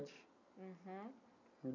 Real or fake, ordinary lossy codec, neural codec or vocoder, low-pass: real; none; none; 7.2 kHz